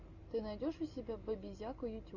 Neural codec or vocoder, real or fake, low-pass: none; real; 7.2 kHz